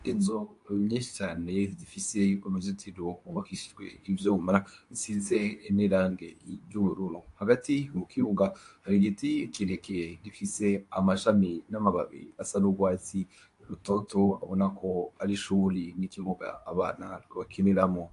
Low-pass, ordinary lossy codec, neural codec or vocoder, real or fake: 10.8 kHz; AAC, 64 kbps; codec, 24 kHz, 0.9 kbps, WavTokenizer, medium speech release version 2; fake